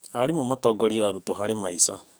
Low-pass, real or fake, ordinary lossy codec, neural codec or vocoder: none; fake; none; codec, 44.1 kHz, 2.6 kbps, SNAC